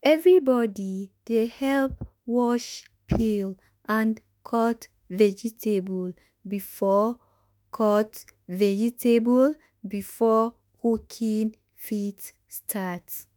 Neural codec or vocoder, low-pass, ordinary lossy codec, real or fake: autoencoder, 48 kHz, 32 numbers a frame, DAC-VAE, trained on Japanese speech; none; none; fake